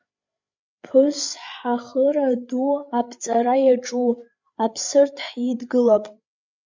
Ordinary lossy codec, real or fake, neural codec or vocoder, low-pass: MP3, 64 kbps; fake; codec, 16 kHz, 8 kbps, FreqCodec, larger model; 7.2 kHz